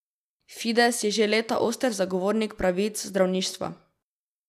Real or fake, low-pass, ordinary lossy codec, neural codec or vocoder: real; 14.4 kHz; none; none